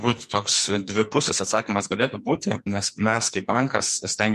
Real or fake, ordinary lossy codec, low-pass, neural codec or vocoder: fake; MP3, 64 kbps; 14.4 kHz; codec, 44.1 kHz, 2.6 kbps, SNAC